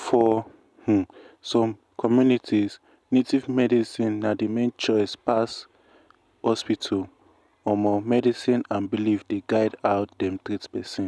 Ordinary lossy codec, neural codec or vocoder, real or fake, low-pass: none; none; real; none